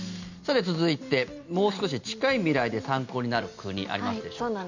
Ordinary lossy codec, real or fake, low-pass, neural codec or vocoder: none; real; 7.2 kHz; none